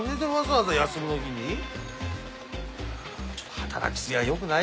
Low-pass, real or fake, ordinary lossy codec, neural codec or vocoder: none; real; none; none